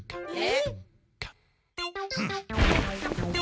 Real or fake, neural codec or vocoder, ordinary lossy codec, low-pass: real; none; none; none